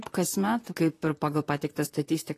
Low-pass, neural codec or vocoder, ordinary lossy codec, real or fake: 14.4 kHz; vocoder, 44.1 kHz, 128 mel bands, Pupu-Vocoder; AAC, 48 kbps; fake